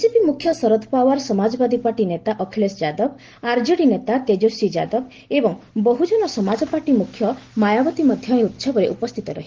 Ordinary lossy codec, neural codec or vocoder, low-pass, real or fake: Opus, 16 kbps; none; 7.2 kHz; real